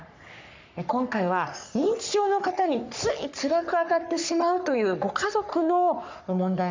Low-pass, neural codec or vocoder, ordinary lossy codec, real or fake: 7.2 kHz; codec, 44.1 kHz, 3.4 kbps, Pupu-Codec; MP3, 64 kbps; fake